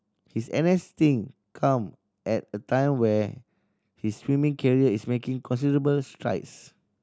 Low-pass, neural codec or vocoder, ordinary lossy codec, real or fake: none; none; none; real